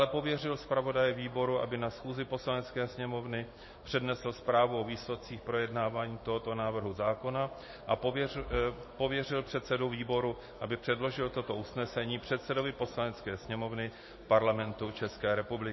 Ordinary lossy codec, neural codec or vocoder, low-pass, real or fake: MP3, 24 kbps; none; 7.2 kHz; real